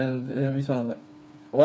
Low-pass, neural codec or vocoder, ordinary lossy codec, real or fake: none; codec, 16 kHz, 4 kbps, FreqCodec, smaller model; none; fake